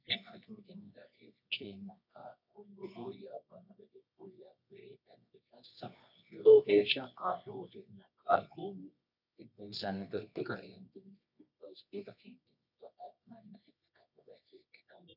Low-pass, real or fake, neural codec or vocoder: 5.4 kHz; fake; codec, 24 kHz, 0.9 kbps, WavTokenizer, medium music audio release